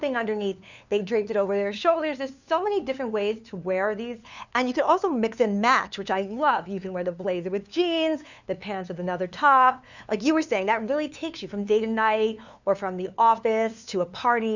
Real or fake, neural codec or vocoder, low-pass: fake; codec, 16 kHz, 2 kbps, FunCodec, trained on LibriTTS, 25 frames a second; 7.2 kHz